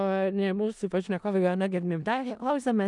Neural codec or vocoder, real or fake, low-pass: codec, 16 kHz in and 24 kHz out, 0.4 kbps, LongCat-Audio-Codec, four codebook decoder; fake; 10.8 kHz